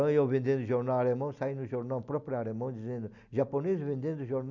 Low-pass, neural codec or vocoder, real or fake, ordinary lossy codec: 7.2 kHz; none; real; none